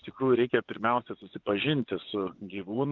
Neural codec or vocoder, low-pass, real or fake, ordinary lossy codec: vocoder, 24 kHz, 100 mel bands, Vocos; 7.2 kHz; fake; Opus, 32 kbps